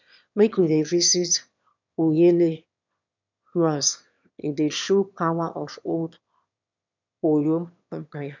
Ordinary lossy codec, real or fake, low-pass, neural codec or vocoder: none; fake; 7.2 kHz; autoencoder, 22.05 kHz, a latent of 192 numbers a frame, VITS, trained on one speaker